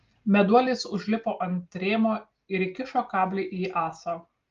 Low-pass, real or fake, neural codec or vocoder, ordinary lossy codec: 7.2 kHz; real; none; Opus, 32 kbps